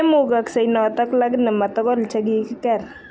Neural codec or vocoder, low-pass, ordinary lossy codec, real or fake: none; none; none; real